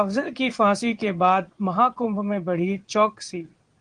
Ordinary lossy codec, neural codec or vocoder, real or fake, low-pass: Opus, 24 kbps; vocoder, 22.05 kHz, 80 mel bands, Vocos; fake; 9.9 kHz